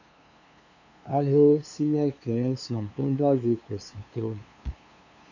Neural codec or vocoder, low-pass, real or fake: codec, 16 kHz, 2 kbps, FunCodec, trained on LibriTTS, 25 frames a second; 7.2 kHz; fake